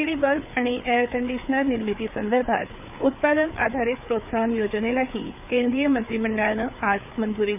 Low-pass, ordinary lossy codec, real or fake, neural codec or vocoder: 3.6 kHz; none; fake; codec, 16 kHz, 4 kbps, FreqCodec, larger model